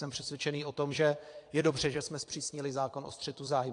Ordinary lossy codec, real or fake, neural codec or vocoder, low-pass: AAC, 48 kbps; fake; vocoder, 22.05 kHz, 80 mel bands, Vocos; 9.9 kHz